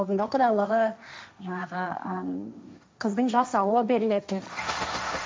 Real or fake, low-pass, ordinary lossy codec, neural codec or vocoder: fake; none; none; codec, 16 kHz, 1.1 kbps, Voila-Tokenizer